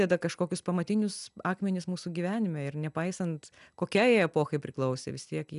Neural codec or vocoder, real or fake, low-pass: none; real; 10.8 kHz